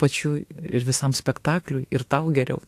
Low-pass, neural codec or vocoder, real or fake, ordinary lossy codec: 14.4 kHz; autoencoder, 48 kHz, 32 numbers a frame, DAC-VAE, trained on Japanese speech; fake; AAC, 64 kbps